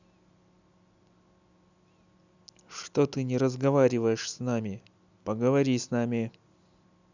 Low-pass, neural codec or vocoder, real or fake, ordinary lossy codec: 7.2 kHz; none; real; none